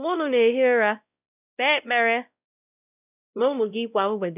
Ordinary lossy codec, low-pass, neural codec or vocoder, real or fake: none; 3.6 kHz; codec, 16 kHz, 0.5 kbps, X-Codec, WavLM features, trained on Multilingual LibriSpeech; fake